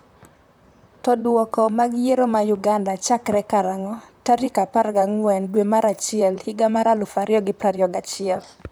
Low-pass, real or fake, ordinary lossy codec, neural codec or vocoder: none; fake; none; vocoder, 44.1 kHz, 128 mel bands, Pupu-Vocoder